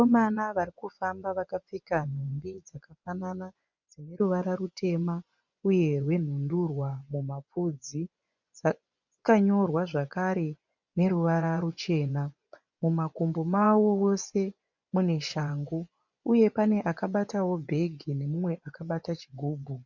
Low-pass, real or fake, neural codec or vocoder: 7.2 kHz; fake; vocoder, 24 kHz, 100 mel bands, Vocos